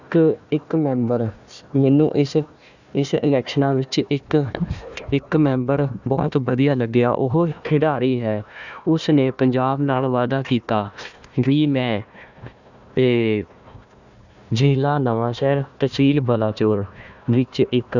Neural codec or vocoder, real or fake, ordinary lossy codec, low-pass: codec, 16 kHz, 1 kbps, FunCodec, trained on Chinese and English, 50 frames a second; fake; none; 7.2 kHz